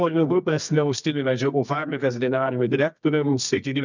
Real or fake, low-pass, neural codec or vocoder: fake; 7.2 kHz; codec, 24 kHz, 0.9 kbps, WavTokenizer, medium music audio release